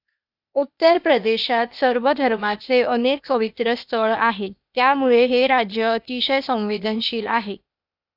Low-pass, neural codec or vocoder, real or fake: 5.4 kHz; codec, 16 kHz, 0.8 kbps, ZipCodec; fake